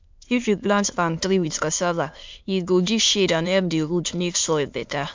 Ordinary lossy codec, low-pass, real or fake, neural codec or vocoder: MP3, 64 kbps; 7.2 kHz; fake; autoencoder, 22.05 kHz, a latent of 192 numbers a frame, VITS, trained on many speakers